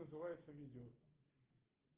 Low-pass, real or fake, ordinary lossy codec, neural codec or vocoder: 3.6 kHz; real; Opus, 16 kbps; none